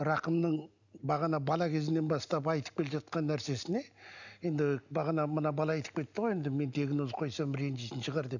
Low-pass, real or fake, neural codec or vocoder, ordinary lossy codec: 7.2 kHz; real; none; none